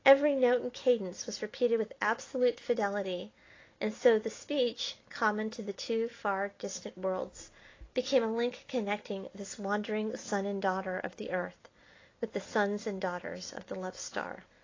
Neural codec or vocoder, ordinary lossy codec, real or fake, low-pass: none; AAC, 32 kbps; real; 7.2 kHz